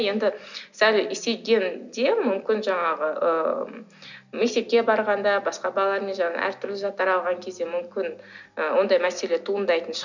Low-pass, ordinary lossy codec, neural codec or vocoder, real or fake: 7.2 kHz; none; none; real